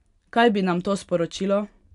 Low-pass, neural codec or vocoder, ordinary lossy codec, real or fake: 10.8 kHz; none; none; real